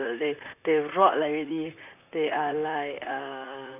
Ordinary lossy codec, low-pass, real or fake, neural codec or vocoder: none; 3.6 kHz; fake; vocoder, 44.1 kHz, 128 mel bands, Pupu-Vocoder